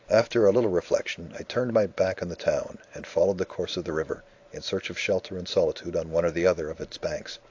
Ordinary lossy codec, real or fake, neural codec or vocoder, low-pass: AAC, 48 kbps; fake; vocoder, 44.1 kHz, 128 mel bands every 512 samples, BigVGAN v2; 7.2 kHz